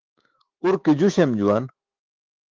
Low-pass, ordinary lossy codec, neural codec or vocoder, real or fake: 7.2 kHz; Opus, 16 kbps; autoencoder, 48 kHz, 128 numbers a frame, DAC-VAE, trained on Japanese speech; fake